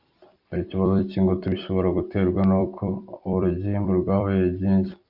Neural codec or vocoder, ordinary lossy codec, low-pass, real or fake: vocoder, 44.1 kHz, 128 mel bands every 256 samples, BigVGAN v2; Opus, 64 kbps; 5.4 kHz; fake